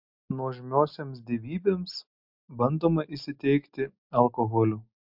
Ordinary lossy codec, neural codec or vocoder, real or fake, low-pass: MP3, 48 kbps; none; real; 5.4 kHz